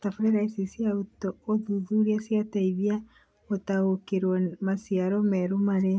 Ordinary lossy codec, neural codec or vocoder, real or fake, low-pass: none; none; real; none